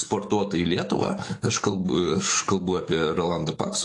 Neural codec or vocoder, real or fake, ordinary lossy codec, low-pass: codec, 44.1 kHz, 7.8 kbps, DAC; fake; AAC, 48 kbps; 10.8 kHz